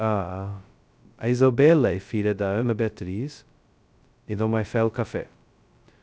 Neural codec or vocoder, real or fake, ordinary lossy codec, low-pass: codec, 16 kHz, 0.2 kbps, FocalCodec; fake; none; none